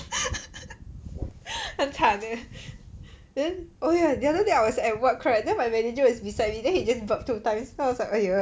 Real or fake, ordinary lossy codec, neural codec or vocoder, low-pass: real; none; none; none